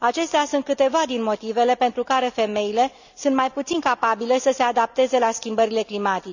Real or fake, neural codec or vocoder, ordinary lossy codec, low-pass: real; none; none; 7.2 kHz